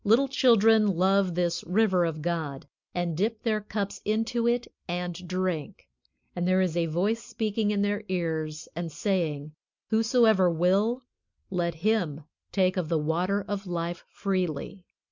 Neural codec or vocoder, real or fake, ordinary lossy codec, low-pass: none; real; MP3, 64 kbps; 7.2 kHz